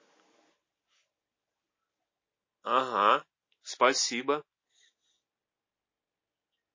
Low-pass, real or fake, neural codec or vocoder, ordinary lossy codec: 7.2 kHz; real; none; MP3, 32 kbps